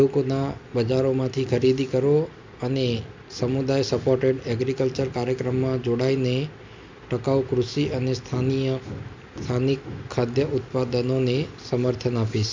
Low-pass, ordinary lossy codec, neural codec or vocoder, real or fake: 7.2 kHz; MP3, 64 kbps; none; real